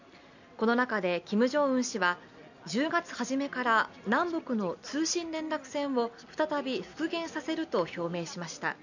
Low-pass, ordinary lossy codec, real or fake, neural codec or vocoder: 7.2 kHz; none; real; none